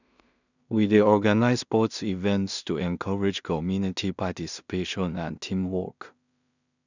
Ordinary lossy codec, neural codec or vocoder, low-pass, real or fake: none; codec, 16 kHz in and 24 kHz out, 0.4 kbps, LongCat-Audio-Codec, two codebook decoder; 7.2 kHz; fake